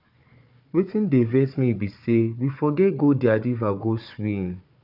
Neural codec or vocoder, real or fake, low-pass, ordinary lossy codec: codec, 16 kHz, 4 kbps, FunCodec, trained on Chinese and English, 50 frames a second; fake; 5.4 kHz; none